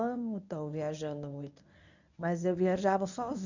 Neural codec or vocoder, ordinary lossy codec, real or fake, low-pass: codec, 24 kHz, 0.9 kbps, WavTokenizer, medium speech release version 1; none; fake; 7.2 kHz